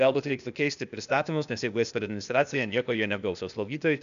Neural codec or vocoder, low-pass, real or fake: codec, 16 kHz, 0.8 kbps, ZipCodec; 7.2 kHz; fake